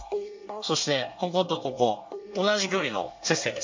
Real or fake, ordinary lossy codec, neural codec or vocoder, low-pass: fake; MP3, 48 kbps; codec, 24 kHz, 1 kbps, SNAC; 7.2 kHz